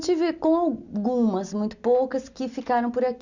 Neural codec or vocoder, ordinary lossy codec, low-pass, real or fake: none; none; 7.2 kHz; real